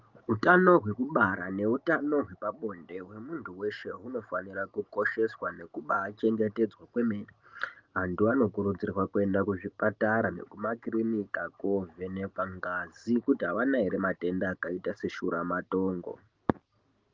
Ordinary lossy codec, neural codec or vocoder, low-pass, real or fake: Opus, 32 kbps; none; 7.2 kHz; real